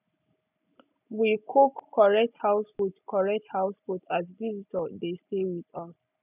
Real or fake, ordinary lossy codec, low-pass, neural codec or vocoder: real; none; 3.6 kHz; none